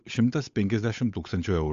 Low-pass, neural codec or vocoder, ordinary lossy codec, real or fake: 7.2 kHz; codec, 16 kHz, 8 kbps, FunCodec, trained on Chinese and English, 25 frames a second; AAC, 64 kbps; fake